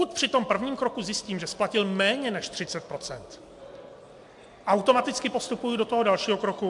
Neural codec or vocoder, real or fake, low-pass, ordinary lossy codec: none; real; 10.8 kHz; MP3, 64 kbps